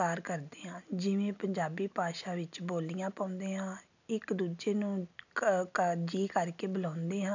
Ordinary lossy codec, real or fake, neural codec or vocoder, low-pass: none; real; none; 7.2 kHz